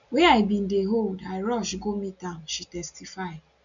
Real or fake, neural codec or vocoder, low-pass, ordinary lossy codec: real; none; 7.2 kHz; none